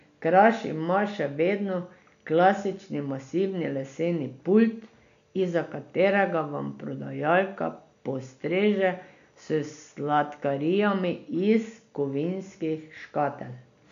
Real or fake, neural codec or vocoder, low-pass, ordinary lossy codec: real; none; 7.2 kHz; none